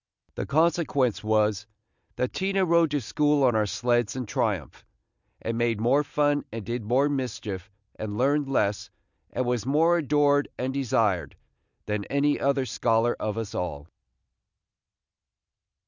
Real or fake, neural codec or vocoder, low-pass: real; none; 7.2 kHz